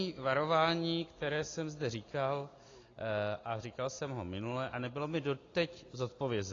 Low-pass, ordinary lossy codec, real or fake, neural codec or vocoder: 7.2 kHz; AAC, 32 kbps; real; none